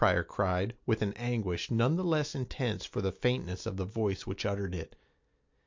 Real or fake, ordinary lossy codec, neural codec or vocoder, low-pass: real; MP3, 48 kbps; none; 7.2 kHz